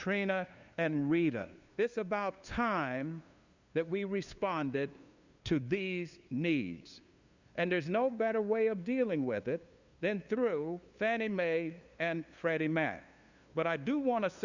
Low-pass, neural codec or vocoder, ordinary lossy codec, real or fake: 7.2 kHz; codec, 16 kHz, 2 kbps, FunCodec, trained on LibriTTS, 25 frames a second; Opus, 64 kbps; fake